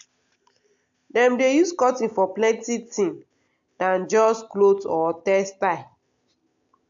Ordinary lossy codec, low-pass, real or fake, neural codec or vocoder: AAC, 64 kbps; 7.2 kHz; real; none